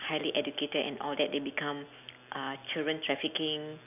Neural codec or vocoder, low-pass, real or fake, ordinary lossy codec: none; 3.6 kHz; real; none